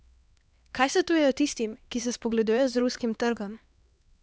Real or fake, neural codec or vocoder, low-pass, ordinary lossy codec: fake; codec, 16 kHz, 2 kbps, X-Codec, HuBERT features, trained on LibriSpeech; none; none